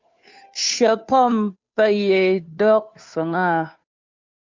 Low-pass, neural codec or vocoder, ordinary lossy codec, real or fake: 7.2 kHz; codec, 16 kHz, 2 kbps, FunCodec, trained on Chinese and English, 25 frames a second; MP3, 64 kbps; fake